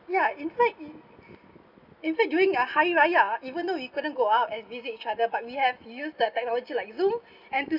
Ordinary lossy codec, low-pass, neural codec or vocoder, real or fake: none; 5.4 kHz; none; real